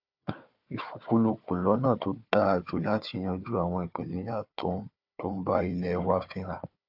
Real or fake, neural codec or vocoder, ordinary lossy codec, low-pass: fake; codec, 16 kHz, 4 kbps, FunCodec, trained on Chinese and English, 50 frames a second; AAC, 48 kbps; 5.4 kHz